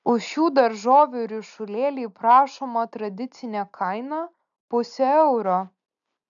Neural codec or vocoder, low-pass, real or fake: none; 7.2 kHz; real